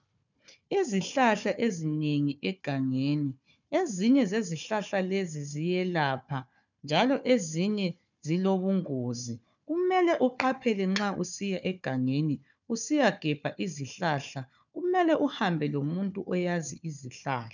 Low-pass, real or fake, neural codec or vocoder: 7.2 kHz; fake; codec, 16 kHz, 4 kbps, FunCodec, trained on Chinese and English, 50 frames a second